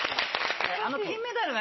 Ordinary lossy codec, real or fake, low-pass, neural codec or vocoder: MP3, 24 kbps; real; 7.2 kHz; none